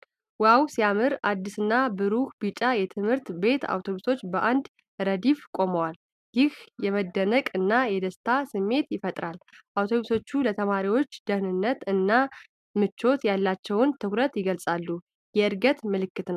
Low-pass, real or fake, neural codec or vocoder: 14.4 kHz; real; none